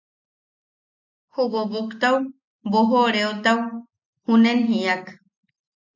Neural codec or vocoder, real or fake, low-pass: none; real; 7.2 kHz